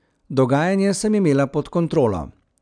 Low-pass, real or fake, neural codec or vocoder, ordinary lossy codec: 9.9 kHz; real; none; none